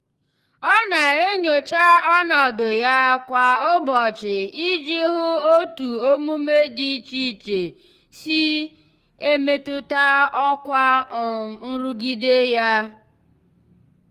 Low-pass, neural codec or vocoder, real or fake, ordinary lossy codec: 14.4 kHz; codec, 44.1 kHz, 2.6 kbps, SNAC; fake; Opus, 32 kbps